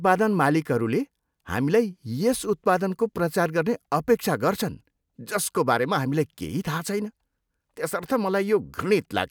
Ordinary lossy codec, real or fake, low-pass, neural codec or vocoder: none; real; none; none